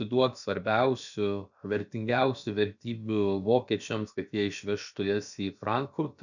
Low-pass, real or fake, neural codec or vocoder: 7.2 kHz; fake; codec, 16 kHz, about 1 kbps, DyCAST, with the encoder's durations